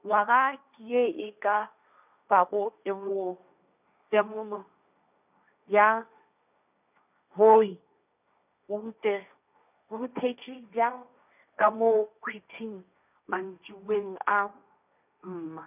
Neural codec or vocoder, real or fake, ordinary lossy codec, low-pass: codec, 16 kHz, 1.1 kbps, Voila-Tokenizer; fake; none; 3.6 kHz